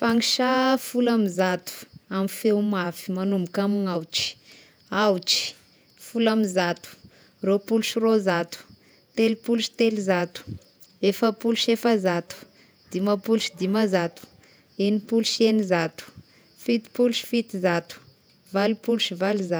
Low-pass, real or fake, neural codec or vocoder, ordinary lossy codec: none; fake; vocoder, 48 kHz, 128 mel bands, Vocos; none